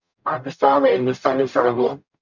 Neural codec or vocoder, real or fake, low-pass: codec, 44.1 kHz, 0.9 kbps, DAC; fake; 7.2 kHz